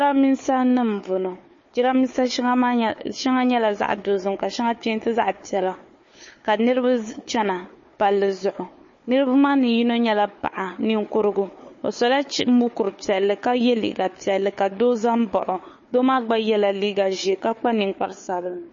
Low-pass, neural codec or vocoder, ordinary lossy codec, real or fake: 7.2 kHz; codec, 16 kHz, 4 kbps, FunCodec, trained on Chinese and English, 50 frames a second; MP3, 32 kbps; fake